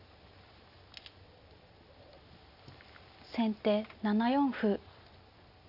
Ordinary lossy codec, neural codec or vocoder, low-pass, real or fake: none; none; 5.4 kHz; real